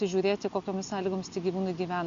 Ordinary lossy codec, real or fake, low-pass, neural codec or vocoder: Opus, 64 kbps; real; 7.2 kHz; none